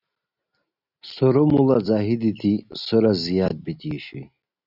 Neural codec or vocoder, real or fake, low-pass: none; real; 5.4 kHz